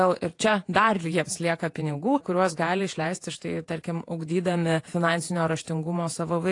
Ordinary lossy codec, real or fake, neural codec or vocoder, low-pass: AAC, 48 kbps; fake; vocoder, 48 kHz, 128 mel bands, Vocos; 10.8 kHz